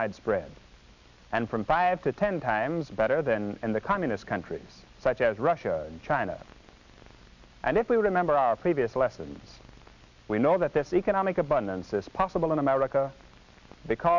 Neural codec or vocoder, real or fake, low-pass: none; real; 7.2 kHz